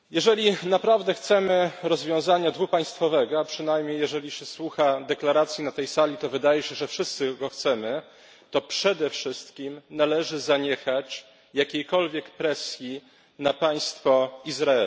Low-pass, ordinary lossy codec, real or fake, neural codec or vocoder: none; none; real; none